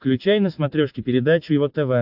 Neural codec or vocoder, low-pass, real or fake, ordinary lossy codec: none; 5.4 kHz; real; AAC, 48 kbps